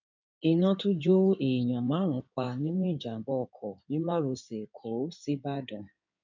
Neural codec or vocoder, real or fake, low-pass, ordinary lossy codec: codec, 16 kHz in and 24 kHz out, 2.2 kbps, FireRedTTS-2 codec; fake; 7.2 kHz; MP3, 64 kbps